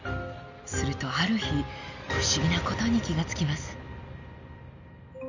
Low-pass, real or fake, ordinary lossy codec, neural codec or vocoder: 7.2 kHz; real; none; none